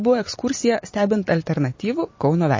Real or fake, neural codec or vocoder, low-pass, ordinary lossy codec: fake; vocoder, 44.1 kHz, 128 mel bands every 256 samples, BigVGAN v2; 7.2 kHz; MP3, 32 kbps